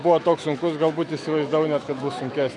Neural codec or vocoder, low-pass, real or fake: autoencoder, 48 kHz, 128 numbers a frame, DAC-VAE, trained on Japanese speech; 10.8 kHz; fake